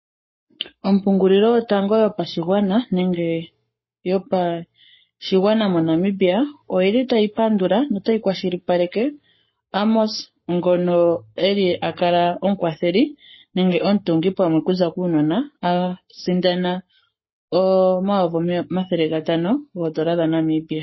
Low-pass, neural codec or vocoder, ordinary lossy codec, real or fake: 7.2 kHz; codec, 44.1 kHz, 7.8 kbps, Pupu-Codec; MP3, 24 kbps; fake